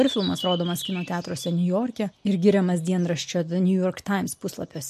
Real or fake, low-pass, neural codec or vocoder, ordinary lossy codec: real; 14.4 kHz; none; MP3, 64 kbps